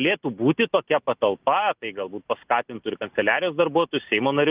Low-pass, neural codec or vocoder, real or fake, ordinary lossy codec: 3.6 kHz; none; real; Opus, 24 kbps